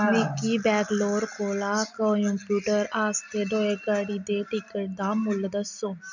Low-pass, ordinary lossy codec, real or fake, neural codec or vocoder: 7.2 kHz; none; real; none